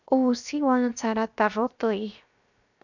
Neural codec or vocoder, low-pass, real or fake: codec, 16 kHz, 0.7 kbps, FocalCodec; 7.2 kHz; fake